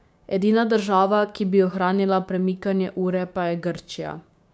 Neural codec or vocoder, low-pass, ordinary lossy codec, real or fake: codec, 16 kHz, 6 kbps, DAC; none; none; fake